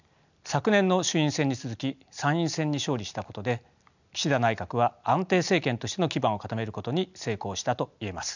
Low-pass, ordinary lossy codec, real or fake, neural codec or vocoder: 7.2 kHz; none; real; none